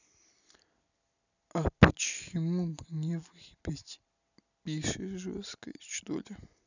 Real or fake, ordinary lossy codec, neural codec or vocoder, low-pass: real; none; none; 7.2 kHz